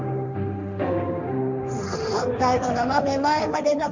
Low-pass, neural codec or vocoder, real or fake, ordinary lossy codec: 7.2 kHz; codec, 16 kHz, 1.1 kbps, Voila-Tokenizer; fake; none